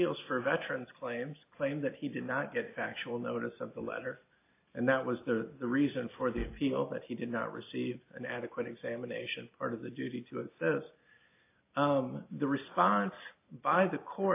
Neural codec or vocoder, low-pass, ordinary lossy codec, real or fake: none; 3.6 kHz; AAC, 24 kbps; real